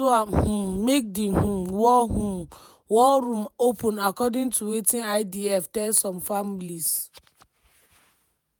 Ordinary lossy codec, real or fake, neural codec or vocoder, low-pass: none; fake; vocoder, 48 kHz, 128 mel bands, Vocos; none